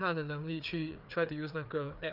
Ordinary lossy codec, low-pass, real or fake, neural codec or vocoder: none; 5.4 kHz; fake; codec, 16 kHz, 4 kbps, FreqCodec, larger model